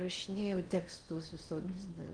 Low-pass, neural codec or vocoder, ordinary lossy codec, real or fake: 9.9 kHz; codec, 16 kHz in and 24 kHz out, 0.6 kbps, FocalCodec, streaming, 4096 codes; Opus, 24 kbps; fake